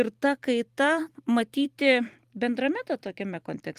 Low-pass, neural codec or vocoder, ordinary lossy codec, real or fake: 14.4 kHz; vocoder, 44.1 kHz, 128 mel bands every 256 samples, BigVGAN v2; Opus, 24 kbps; fake